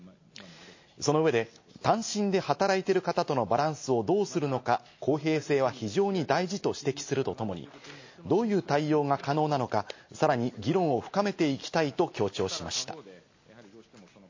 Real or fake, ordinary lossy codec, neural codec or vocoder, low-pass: real; MP3, 32 kbps; none; 7.2 kHz